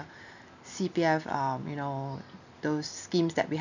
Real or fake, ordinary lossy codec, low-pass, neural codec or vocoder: real; none; 7.2 kHz; none